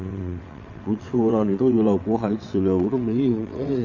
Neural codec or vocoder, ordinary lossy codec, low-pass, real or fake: vocoder, 22.05 kHz, 80 mel bands, WaveNeXt; none; 7.2 kHz; fake